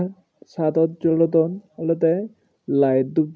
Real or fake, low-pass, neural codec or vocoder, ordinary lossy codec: real; none; none; none